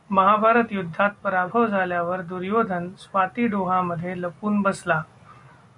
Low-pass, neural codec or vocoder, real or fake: 10.8 kHz; none; real